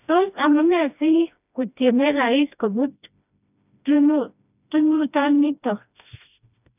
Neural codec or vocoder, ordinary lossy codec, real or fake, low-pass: codec, 16 kHz, 1 kbps, FreqCodec, smaller model; none; fake; 3.6 kHz